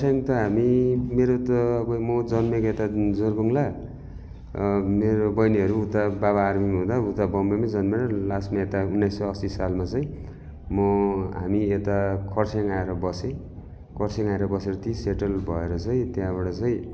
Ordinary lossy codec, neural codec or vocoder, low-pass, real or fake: none; none; none; real